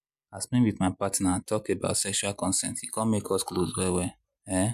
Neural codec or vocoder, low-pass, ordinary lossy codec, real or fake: none; 14.4 kHz; MP3, 96 kbps; real